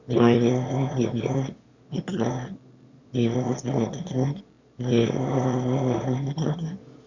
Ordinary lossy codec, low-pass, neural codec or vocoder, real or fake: Opus, 64 kbps; 7.2 kHz; autoencoder, 22.05 kHz, a latent of 192 numbers a frame, VITS, trained on one speaker; fake